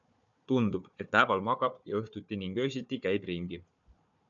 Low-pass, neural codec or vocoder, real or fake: 7.2 kHz; codec, 16 kHz, 4 kbps, FunCodec, trained on Chinese and English, 50 frames a second; fake